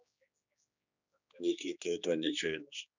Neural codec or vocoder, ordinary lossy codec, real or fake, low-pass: codec, 16 kHz, 2 kbps, X-Codec, HuBERT features, trained on general audio; none; fake; 7.2 kHz